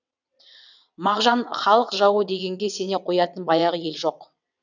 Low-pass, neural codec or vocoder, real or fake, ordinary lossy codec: 7.2 kHz; vocoder, 22.05 kHz, 80 mel bands, WaveNeXt; fake; none